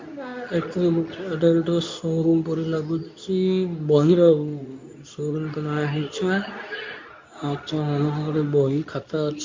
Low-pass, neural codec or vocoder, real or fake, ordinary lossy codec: 7.2 kHz; codec, 24 kHz, 0.9 kbps, WavTokenizer, medium speech release version 2; fake; MP3, 48 kbps